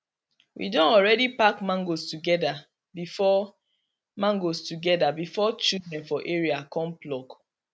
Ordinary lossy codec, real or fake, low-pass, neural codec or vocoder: none; real; none; none